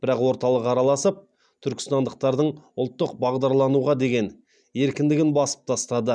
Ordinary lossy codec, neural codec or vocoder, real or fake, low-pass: none; none; real; 9.9 kHz